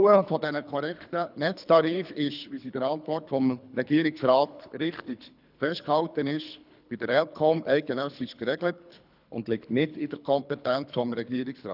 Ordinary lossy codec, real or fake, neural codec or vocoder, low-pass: none; fake; codec, 24 kHz, 3 kbps, HILCodec; 5.4 kHz